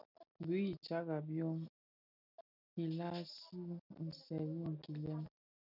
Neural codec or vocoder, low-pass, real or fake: none; 5.4 kHz; real